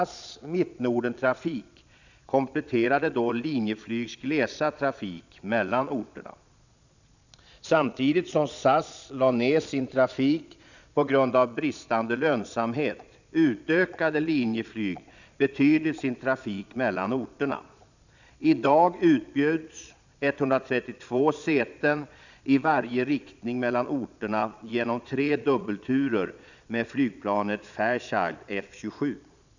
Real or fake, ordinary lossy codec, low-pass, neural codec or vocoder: fake; none; 7.2 kHz; vocoder, 22.05 kHz, 80 mel bands, Vocos